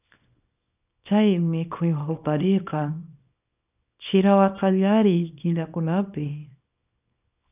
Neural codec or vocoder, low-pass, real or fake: codec, 24 kHz, 0.9 kbps, WavTokenizer, small release; 3.6 kHz; fake